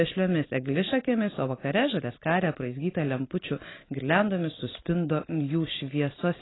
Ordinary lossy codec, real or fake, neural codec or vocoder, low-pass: AAC, 16 kbps; real; none; 7.2 kHz